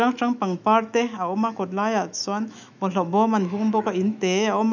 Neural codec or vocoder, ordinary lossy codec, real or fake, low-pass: autoencoder, 48 kHz, 128 numbers a frame, DAC-VAE, trained on Japanese speech; none; fake; 7.2 kHz